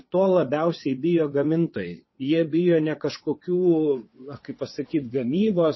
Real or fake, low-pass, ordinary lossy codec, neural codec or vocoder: fake; 7.2 kHz; MP3, 24 kbps; vocoder, 22.05 kHz, 80 mel bands, WaveNeXt